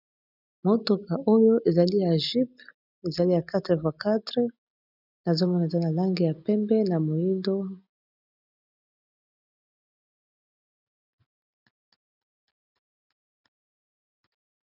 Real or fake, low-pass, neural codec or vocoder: real; 5.4 kHz; none